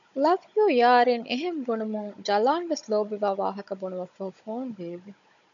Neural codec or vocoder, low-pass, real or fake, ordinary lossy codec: codec, 16 kHz, 16 kbps, FunCodec, trained on Chinese and English, 50 frames a second; 7.2 kHz; fake; MP3, 96 kbps